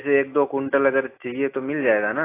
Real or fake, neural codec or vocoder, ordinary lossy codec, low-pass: real; none; AAC, 24 kbps; 3.6 kHz